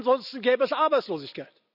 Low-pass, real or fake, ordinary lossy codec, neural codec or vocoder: 5.4 kHz; real; none; none